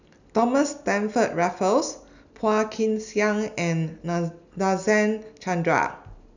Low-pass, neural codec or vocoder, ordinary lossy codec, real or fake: 7.2 kHz; none; none; real